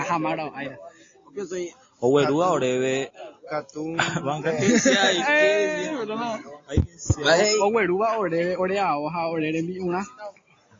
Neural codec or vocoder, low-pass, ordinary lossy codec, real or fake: none; 7.2 kHz; AAC, 32 kbps; real